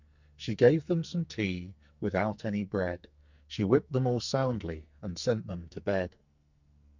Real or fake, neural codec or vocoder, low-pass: fake; codec, 44.1 kHz, 2.6 kbps, SNAC; 7.2 kHz